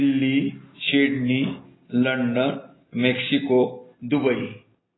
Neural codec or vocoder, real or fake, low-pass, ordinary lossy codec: none; real; 7.2 kHz; AAC, 16 kbps